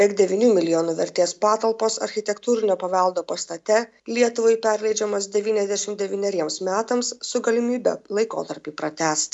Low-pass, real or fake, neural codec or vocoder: 10.8 kHz; real; none